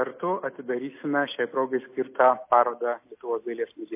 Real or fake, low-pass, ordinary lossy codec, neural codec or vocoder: real; 3.6 kHz; MP3, 24 kbps; none